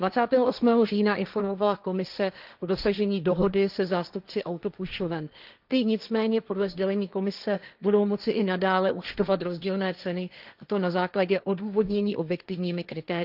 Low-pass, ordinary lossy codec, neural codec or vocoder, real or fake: 5.4 kHz; none; codec, 16 kHz, 1.1 kbps, Voila-Tokenizer; fake